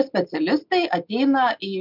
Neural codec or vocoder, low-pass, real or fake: none; 5.4 kHz; real